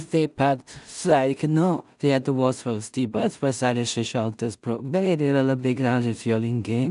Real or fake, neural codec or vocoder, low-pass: fake; codec, 16 kHz in and 24 kHz out, 0.4 kbps, LongCat-Audio-Codec, two codebook decoder; 10.8 kHz